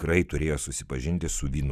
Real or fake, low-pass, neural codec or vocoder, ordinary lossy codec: real; 14.4 kHz; none; Opus, 64 kbps